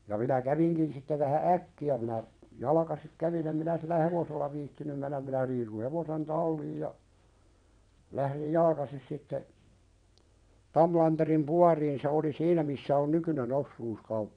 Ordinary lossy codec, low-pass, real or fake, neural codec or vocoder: none; 9.9 kHz; fake; vocoder, 22.05 kHz, 80 mel bands, WaveNeXt